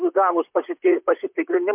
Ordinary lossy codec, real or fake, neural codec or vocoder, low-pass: MP3, 32 kbps; fake; vocoder, 44.1 kHz, 128 mel bands, Pupu-Vocoder; 3.6 kHz